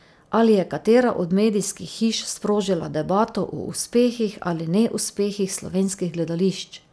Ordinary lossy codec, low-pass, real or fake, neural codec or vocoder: none; none; real; none